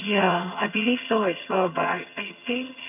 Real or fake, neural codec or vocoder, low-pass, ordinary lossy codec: fake; vocoder, 22.05 kHz, 80 mel bands, HiFi-GAN; 3.6 kHz; none